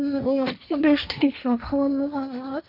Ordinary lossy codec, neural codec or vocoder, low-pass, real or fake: none; codec, 16 kHz, 1.1 kbps, Voila-Tokenizer; 5.4 kHz; fake